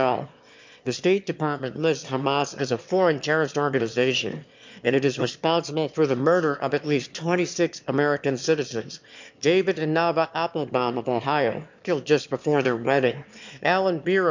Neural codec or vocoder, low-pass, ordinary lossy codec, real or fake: autoencoder, 22.05 kHz, a latent of 192 numbers a frame, VITS, trained on one speaker; 7.2 kHz; MP3, 64 kbps; fake